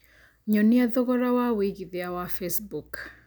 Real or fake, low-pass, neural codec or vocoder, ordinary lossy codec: real; none; none; none